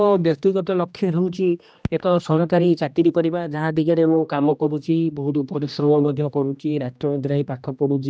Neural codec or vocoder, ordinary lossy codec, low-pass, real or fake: codec, 16 kHz, 1 kbps, X-Codec, HuBERT features, trained on general audio; none; none; fake